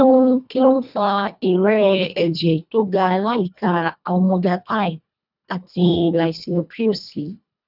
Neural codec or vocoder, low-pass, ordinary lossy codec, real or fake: codec, 24 kHz, 1.5 kbps, HILCodec; 5.4 kHz; none; fake